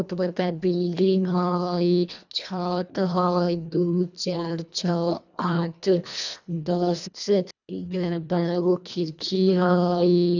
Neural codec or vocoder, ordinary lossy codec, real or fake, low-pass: codec, 24 kHz, 1.5 kbps, HILCodec; none; fake; 7.2 kHz